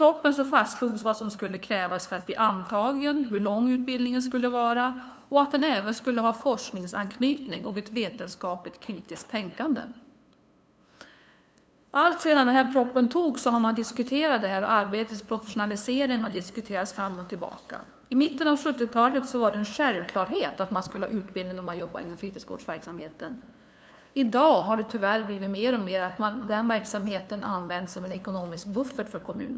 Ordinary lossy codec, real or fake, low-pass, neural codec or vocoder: none; fake; none; codec, 16 kHz, 2 kbps, FunCodec, trained on LibriTTS, 25 frames a second